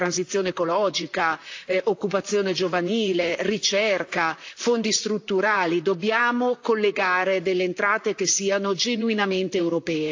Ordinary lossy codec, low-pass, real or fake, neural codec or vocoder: AAC, 48 kbps; 7.2 kHz; fake; vocoder, 44.1 kHz, 128 mel bands, Pupu-Vocoder